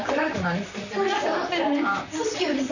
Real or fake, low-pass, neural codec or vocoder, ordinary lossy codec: fake; 7.2 kHz; vocoder, 44.1 kHz, 128 mel bands, Pupu-Vocoder; none